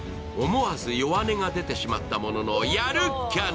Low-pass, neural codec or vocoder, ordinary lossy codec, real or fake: none; none; none; real